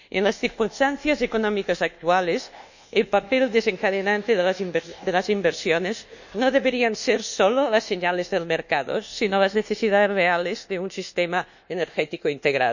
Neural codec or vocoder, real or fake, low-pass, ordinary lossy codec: codec, 24 kHz, 1.2 kbps, DualCodec; fake; 7.2 kHz; none